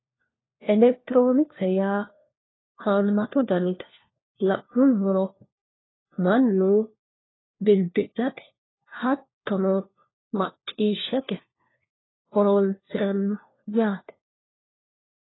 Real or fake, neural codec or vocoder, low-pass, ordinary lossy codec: fake; codec, 16 kHz, 1 kbps, FunCodec, trained on LibriTTS, 50 frames a second; 7.2 kHz; AAC, 16 kbps